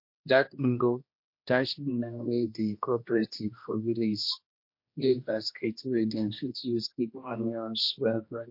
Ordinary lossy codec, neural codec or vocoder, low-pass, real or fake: MP3, 32 kbps; codec, 16 kHz, 1 kbps, X-Codec, HuBERT features, trained on general audio; 5.4 kHz; fake